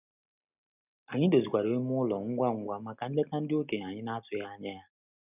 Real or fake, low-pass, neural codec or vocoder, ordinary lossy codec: real; 3.6 kHz; none; none